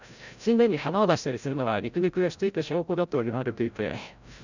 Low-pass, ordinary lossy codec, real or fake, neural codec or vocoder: 7.2 kHz; none; fake; codec, 16 kHz, 0.5 kbps, FreqCodec, larger model